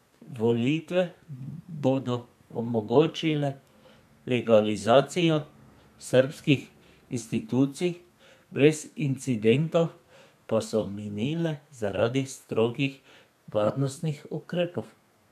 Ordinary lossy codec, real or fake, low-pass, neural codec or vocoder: none; fake; 14.4 kHz; codec, 32 kHz, 1.9 kbps, SNAC